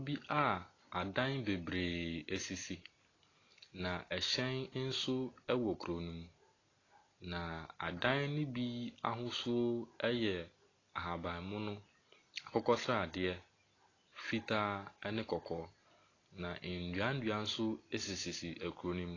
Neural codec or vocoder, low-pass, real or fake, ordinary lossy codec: none; 7.2 kHz; real; AAC, 32 kbps